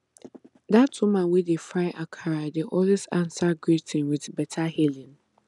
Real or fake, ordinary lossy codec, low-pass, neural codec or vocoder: real; none; 10.8 kHz; none